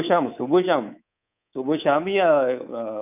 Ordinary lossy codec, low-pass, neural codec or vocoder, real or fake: none; 3.6 kHz; codec, 24 kHz, 3.1 kbps, DualCodec; fake